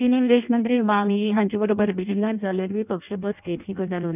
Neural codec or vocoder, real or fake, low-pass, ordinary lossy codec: codec, 16 kHz in and 24 kHz out, 0.6 kbps, FireRedTTS-2 codec; fake; 3.6 kHz; none